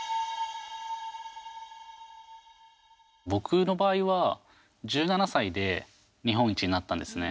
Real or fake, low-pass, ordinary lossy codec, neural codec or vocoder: real; none; none; none